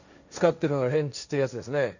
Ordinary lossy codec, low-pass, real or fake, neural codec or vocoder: none; 7.2 kHz; fake; codec, 16 kHz, 1.1 kbps, Voila-Tokenizer